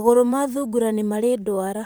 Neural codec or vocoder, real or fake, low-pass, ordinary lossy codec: vocoder, 44.1 kHz, 128 mel bands, Pupu-Vocoder; fake; none; none